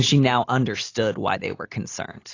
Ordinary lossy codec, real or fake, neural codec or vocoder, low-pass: AAC, 32 kbps; real; none; 7.2 kHz